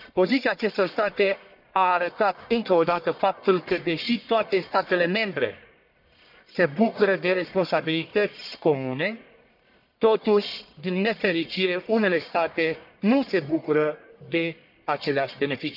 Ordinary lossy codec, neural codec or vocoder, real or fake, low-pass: none; codec, 44.1 kHz, 1.7 kbps, Pupu-Codec; fake; 5.4 kHz